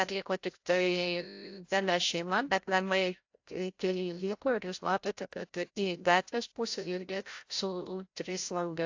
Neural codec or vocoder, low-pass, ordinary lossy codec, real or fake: codec, 16 kHz, 0.5 kbps, FreqCodec, larger model; 7.2 kHz; AAC, 48 kbps; fake